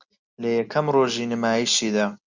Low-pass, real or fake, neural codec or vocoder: 7.2 kHz; real; none